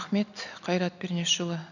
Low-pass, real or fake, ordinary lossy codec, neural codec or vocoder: 7.2 kHz; real; none; none